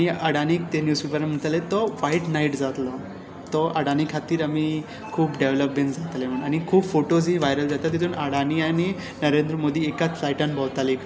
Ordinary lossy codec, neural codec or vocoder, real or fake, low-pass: none; none; real; none